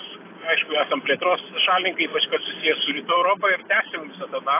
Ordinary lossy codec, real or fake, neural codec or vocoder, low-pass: AAC, 24 kbps; real; none; 3.6 kHz